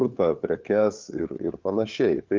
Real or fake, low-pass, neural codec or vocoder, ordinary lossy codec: fake; 7.2 kHz; codec, 16 kHz, 4 kbps, FunCodec, trained on LibriTTS, 50 frames a second; Opus, 24 kbps